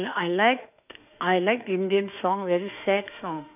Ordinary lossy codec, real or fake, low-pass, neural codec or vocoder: none; fake; 3.6 kHz; autoencoder, 48 kHz, 32 numbers a frame, DAC-VAE, trained on Japanese speech